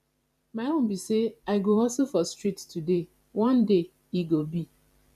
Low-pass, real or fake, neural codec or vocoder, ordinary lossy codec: 14.4 kHz; fake; vocoder, 44.1 kHz, 128 mel bands every 512 samples, BigVGAN v2; none